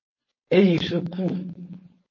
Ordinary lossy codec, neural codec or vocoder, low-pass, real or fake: MP3, 32 kbps; codec, 16 kHz, 4.8 kbps, FACodec; 7.2 kHz; fake